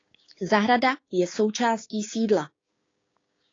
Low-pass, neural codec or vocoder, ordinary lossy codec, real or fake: 7.2 kHz; codec, 16 kHz, 6 kbps, DAC; AAC, 32 kbps; fake